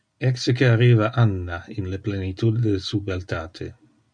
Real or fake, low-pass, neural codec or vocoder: real; 9.9 kHz; none